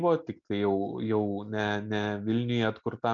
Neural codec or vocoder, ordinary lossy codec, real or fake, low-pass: none; MP3, 64 kbps; real; 7.2 kHz